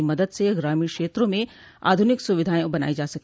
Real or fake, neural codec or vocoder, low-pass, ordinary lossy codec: real; none; none; none